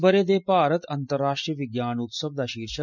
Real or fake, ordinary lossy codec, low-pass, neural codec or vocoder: real; none; 7.2 kHz; none